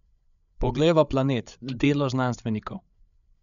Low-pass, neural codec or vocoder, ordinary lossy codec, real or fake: 7.2 kHz; codec, 16 kHz, 8 kbps, FreqCodec, larger model; MP3, 96 kbps; fake